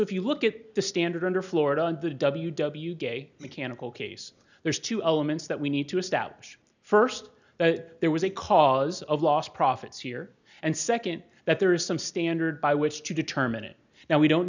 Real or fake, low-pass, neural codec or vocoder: real; 7.2 kHz; none